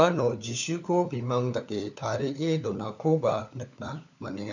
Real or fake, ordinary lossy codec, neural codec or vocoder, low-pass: fake; none; codec, 16 kHz, 4 kbps, FunCodec, trained on LibriTTS, 50 frames a second; 7.2 kHz